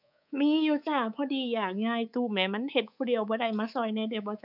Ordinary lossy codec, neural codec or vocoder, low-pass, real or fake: none; codec, 24 kHz, 3.1 kbps, DualCodec; 5.4 kHz; fake